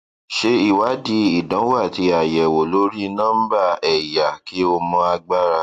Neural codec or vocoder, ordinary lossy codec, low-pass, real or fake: none; AAC, 64 kbps; 14.4 kHz; real